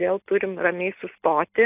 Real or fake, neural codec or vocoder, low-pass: real; none; 3.6 kHz